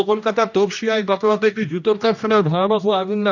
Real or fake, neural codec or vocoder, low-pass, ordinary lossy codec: fake; codec, 16 kHz, 1 kbps, X-Codec, HuBERT features, trained on general audio; 7.2 kHz; none